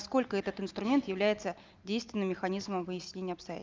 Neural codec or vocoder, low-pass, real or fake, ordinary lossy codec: none; 7.2 kHz; real; Opus, 24 kbps